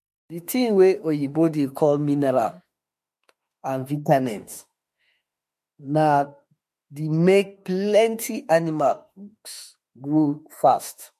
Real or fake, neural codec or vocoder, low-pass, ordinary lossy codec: fake; autoencoder, 48 kHz, 32 numbers a frame, DAC-VAE, trained on Japanese speech; 14.4 kHz; MP3, 64 kbps